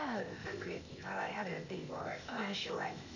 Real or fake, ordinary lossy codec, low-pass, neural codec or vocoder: fake; none; 7.2 kHz; codec, 16 kHz, 0.8 kbps, ZipCodec